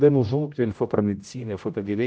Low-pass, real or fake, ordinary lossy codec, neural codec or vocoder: none; fake; none; codec, 16 kHz, 0.5 kbps, X-Codec, HuBERT features, trained on general audio